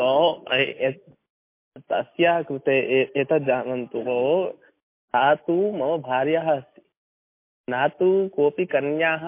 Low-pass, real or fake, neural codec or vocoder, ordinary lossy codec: 3.6 kHz; real; none; MP3, 24 kbps